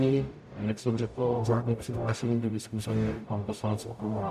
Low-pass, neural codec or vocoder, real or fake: 14.4 kHz; codec, 44.1 kHz, 0.9 kbps, DAC; fake